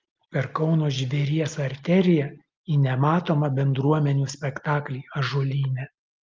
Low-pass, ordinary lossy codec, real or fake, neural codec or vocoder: 7.2 kHz; Opus, 32 kbps; real; none